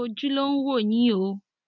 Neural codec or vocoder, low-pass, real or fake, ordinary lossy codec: none; 7.2 kHz; real; none